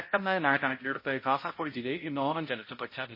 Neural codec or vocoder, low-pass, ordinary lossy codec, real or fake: codec, 16 kHz, 0.5 kbps, X-Codec, HuBERT features, trained on balanced general audio; 5.4 kHz; MP3, 24 kbps; fake